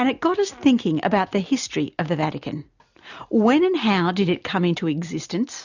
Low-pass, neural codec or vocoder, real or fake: 7.2 kHz; none; real